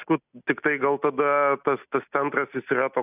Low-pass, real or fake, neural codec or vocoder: 3.6 kHz; real; none